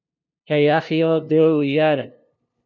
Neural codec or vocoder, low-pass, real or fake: codec, 16 kHz, 0.5 kbps, FunCodec, trained on LibriTTS, 25 frames a second; 7.2 kHz; fake